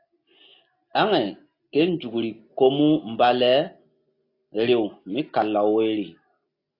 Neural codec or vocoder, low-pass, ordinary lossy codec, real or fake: none; 5.4 kHz; AAC, 32 kbps; real